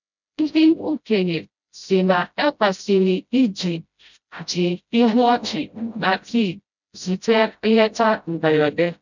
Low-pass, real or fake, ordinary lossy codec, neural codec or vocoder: 7.2 kHz; fake; none; codec, 16 kHz, 0.5 kbps, FreqCodec, smaller model